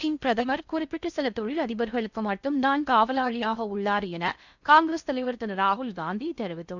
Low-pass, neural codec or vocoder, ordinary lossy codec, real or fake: 7.2 kHz; codec, 16 kHz in and 24 kHz out, 0.6 kbps, FocalCodec, streaming, 4096 codes; none; fake